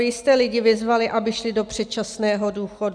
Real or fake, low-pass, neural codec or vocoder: real; 9.9 kHz; none